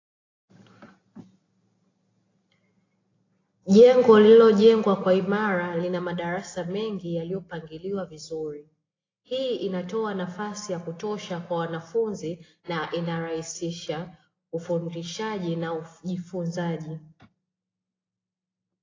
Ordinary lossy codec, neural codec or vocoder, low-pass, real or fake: AAC, 32 kbps; none; 7.2 kHz; real